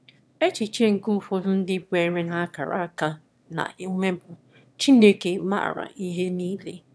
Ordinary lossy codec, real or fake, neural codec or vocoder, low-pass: none; fake; autoencoder, 22.05 kHz, a latent of 192 numbers a frame, VITS, trained on one speaker; none